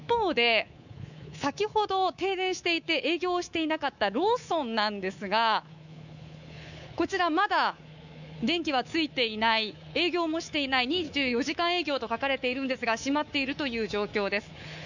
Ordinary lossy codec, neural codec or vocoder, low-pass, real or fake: none; codec, 24 kHz, 3.1 kbps, DualCodec; 7.2 kHz; fake